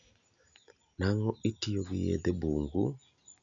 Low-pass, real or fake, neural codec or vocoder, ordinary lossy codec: 7.2 kHz; real; none; none